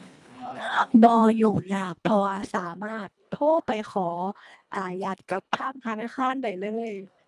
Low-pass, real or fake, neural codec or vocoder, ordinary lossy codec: none; fake; codec, 24 kHz, 1.5 kbps, HILCodec; none